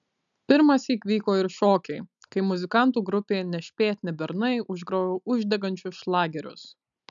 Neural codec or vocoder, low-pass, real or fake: none; 7.2 kHz; real